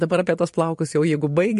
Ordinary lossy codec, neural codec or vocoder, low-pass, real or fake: MP3, 48 kbps; vocoder, 44.1 kHz, 128 mel bands every 512 samples, BigVGAN v2; 14.4 kHz; fake